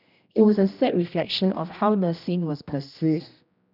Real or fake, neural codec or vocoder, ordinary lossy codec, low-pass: fake; codec, 16 kHz, 1 kbps, X-Codec, HuBERT features, trained on general audio; none; 5.4 kHz